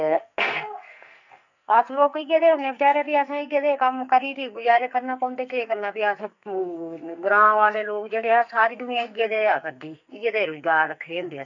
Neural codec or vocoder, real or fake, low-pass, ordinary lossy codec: codec, 44.1 kHz, 2.6 kbps, SNAC; fake; 7.2 kHz; none